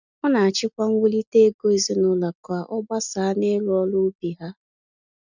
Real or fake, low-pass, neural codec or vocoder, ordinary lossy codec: real; 7.2 kHz; none; none